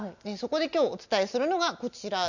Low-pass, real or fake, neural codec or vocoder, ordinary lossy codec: 7.2 kHz; real; none; none